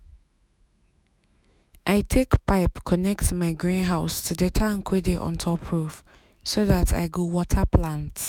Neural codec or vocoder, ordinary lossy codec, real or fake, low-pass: autoencoder, 48 kHz, 128 numbers a frame, DAC-VAE, trained on Japanese speech; none; fake; 19.8 kHz